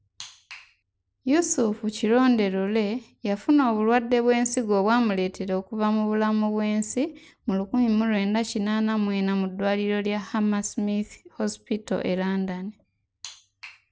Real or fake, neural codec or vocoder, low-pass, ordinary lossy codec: real; none; none; none